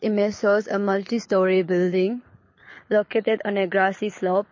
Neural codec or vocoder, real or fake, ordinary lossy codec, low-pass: codec, 24 kHz, 6 kbps, HILCodec; fake; MP3, 32 kbps; 7.2 kHz